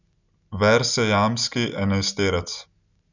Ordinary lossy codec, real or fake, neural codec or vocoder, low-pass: none; real; none; 7.2 kHz